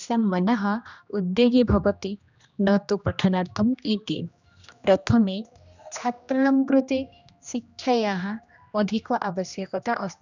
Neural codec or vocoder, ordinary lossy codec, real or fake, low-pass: codec, 16 kHz, 1 kbps, X-Codec, HuBERT features, trained on general audio; none; fake; 7.2 kHz